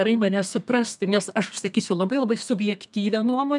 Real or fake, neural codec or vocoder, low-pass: fake; codec, 32 kHz, 1.9 kbps, SNAC; 10.8 kHz